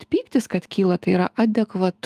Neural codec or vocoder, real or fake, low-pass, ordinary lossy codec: autoencoder, 48 kHz, 128 numbers a frame, DAC-VAE, trained on Japanese speech; fake; 14.4 kHz; Opus, 32 kbps